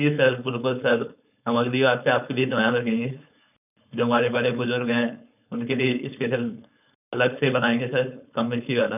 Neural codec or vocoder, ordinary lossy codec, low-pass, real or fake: codec, 16 kHz, 4.8 kbps, FACodec; none; 3.6 kHz; fake